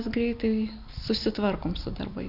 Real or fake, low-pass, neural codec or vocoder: real; 5.4 kHz; none